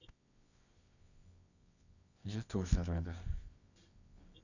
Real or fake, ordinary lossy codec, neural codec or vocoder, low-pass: fake; none; codec, 24 kHz, 0.9 kbps, WavTokenizer, medium music audio release; 7.2 kHz